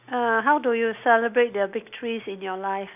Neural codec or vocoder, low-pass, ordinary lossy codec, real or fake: none; 3.6 kHz; none; real